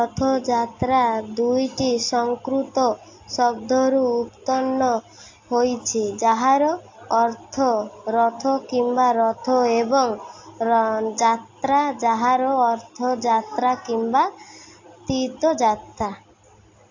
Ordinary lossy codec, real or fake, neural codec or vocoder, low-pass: AAC, 48 kbps; real; none; 7.2 kHz